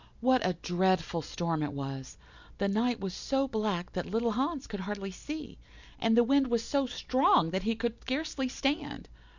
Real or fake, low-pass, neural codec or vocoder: real; 7.2 kHz; none